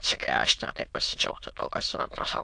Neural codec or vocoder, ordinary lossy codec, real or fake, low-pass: autoencoder, 22.05 kHz, a latent of 192 numbers a frame, VITS, trained on many speakers; AAC, 64 kbps; fake; 9.9 kHz